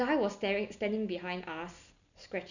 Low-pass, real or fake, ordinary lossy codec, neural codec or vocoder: 7.2 kHz; real; none; none